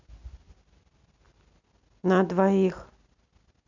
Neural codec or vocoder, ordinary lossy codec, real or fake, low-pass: none; none; real; 7.2 kHz